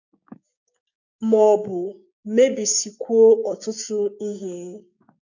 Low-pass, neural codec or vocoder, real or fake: 7.2 kHz; codec, 16 kHz, 6 kbps, DAC; fake